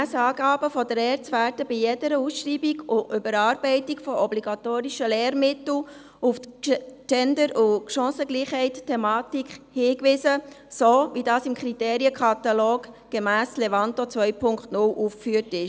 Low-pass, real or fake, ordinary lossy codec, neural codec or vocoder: none; real; none; none